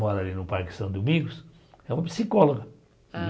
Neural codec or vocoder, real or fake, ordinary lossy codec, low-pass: none; real; none; none